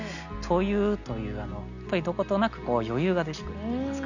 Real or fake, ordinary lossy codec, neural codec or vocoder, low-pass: real; none; none; 7.2 kHz